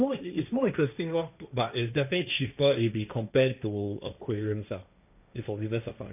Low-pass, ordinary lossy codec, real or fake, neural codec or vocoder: 3.6 kHz; none; fake; codec, 16 kHz, 1.1 kbps, Voila-Tokenizer